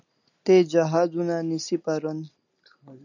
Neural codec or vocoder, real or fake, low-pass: none; real; 7.2 kHz